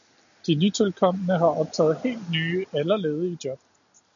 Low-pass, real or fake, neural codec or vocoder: 7.2 kHz; real; none